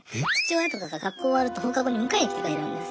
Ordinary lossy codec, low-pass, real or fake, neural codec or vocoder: none; none; real; none